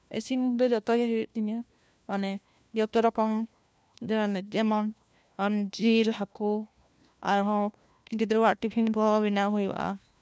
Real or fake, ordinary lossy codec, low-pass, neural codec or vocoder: fake; none; none; codec, 16 kHz, 1 kbps, FunCodec, trained on LibriTTS, 50 frames a second